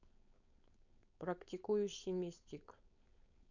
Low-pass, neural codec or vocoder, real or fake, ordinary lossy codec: 7.2 kHz; codec, 16 kHz, 4.8 kbps, FACodec; fake; none